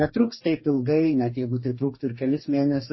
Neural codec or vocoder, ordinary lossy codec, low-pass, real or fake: codec, 44.1 kHz, 2.6 kbps, DAC; MP3, 24 kbps; 7.2 kHz; fake